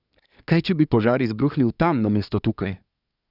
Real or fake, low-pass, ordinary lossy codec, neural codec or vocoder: fake; 5.4 kHz; none; codec, 24 kHz, 1 kbps, SNAC